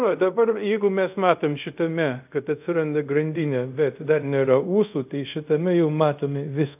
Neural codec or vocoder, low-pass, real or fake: codec, 24 kHz, 0.5 kbps, DualCodec; 3.6 kHz; fake